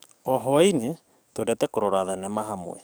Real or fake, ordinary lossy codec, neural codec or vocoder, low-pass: fake; none; codec, 44.1 kHz, 7.8 kbps, DAC; none